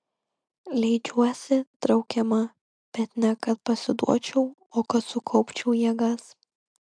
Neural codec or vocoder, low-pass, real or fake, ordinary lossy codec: none; 9.9 kHz; real; MP3, 96 kbps